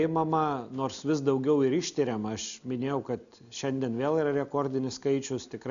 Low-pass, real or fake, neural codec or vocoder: 7.2 kHz; real; none